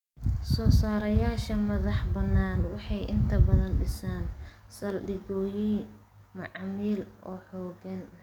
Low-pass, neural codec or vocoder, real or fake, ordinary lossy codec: 19.8 kHz; codec, 44.1 kHz, 7.8 kbps, DAC; fake; none